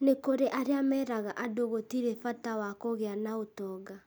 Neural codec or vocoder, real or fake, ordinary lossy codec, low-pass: none; real; none; none